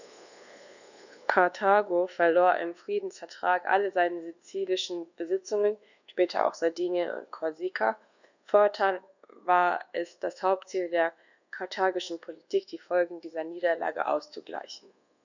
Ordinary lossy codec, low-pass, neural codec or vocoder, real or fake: none; 7.2 kHz; codec, 24 kHz, 1.2 kbps, DualCodec; fake